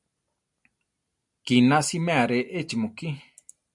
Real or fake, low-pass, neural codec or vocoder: real; 10.8 kHz; none